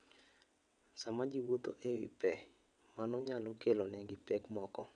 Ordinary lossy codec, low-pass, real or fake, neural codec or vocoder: MP3, 96 kbps; 9.9 kHz; fake; vocoder, 22.05 kHz, 80 mel bands, WaveNeXt